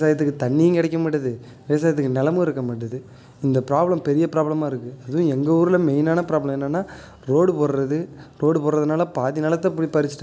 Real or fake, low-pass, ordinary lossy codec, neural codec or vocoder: real; none; none; none